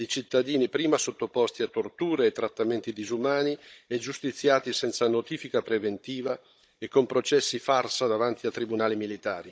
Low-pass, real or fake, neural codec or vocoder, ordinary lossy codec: none; fake; codec, 16 kHz, 16 kbps, FunCodec, trained on Chinese and English, 50 frames a second; none